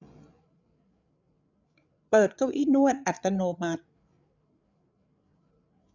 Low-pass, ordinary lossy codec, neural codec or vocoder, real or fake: 7.2 kHz; none; codec, 16 kHz, 8 kbps, FreqCodec, larger model; fake